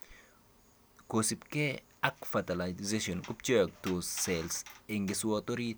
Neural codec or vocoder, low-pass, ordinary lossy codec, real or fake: none; none; none; real